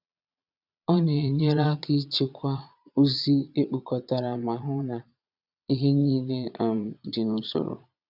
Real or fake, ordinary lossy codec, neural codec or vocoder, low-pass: fake; none; vocoder, 22.05 kHz, 80 mel bands, Vocos; 5.4 kHz